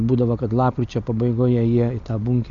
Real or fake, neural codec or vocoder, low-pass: real; none; 7.2 kHz